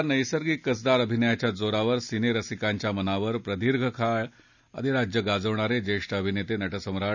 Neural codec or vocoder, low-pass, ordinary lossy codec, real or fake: none; 7.2 kHz; none; real